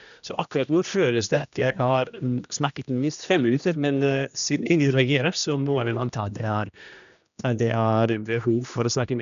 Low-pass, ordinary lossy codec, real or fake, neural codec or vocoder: 7.2 kHz; none; fake; codec, 16 kHz, 1 kbps, X-Codec, HuBERT features, trained on general audio